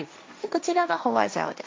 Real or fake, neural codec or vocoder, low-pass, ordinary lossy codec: fake; codec, 16 kHz, 1.1 kbps, Voila-Tokenizer; none; none